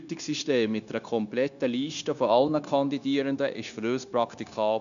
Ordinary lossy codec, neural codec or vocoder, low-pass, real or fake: none; codec, 16 kHz, 0.9 kbps, LongCat-Audio-Codec; 7.2 kHz; fake